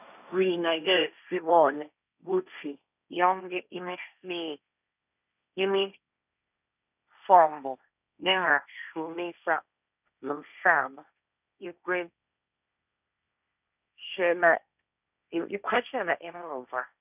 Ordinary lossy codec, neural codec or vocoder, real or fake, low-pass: none; codec, 16 kHz, 1.1 kbps, Voila-Tokenizer; fake; 3.6 kHz